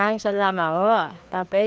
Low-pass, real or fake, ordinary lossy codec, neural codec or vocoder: none; fake; none; codec, 16 kHz, 2 kbps, FreqCodec, larger model